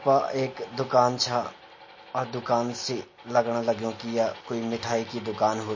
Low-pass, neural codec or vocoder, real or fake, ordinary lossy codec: 7.2 kHz; none; real; MP3, 32 kbps